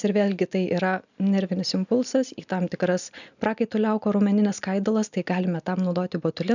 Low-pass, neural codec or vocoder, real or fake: 7.2 kHz; none; real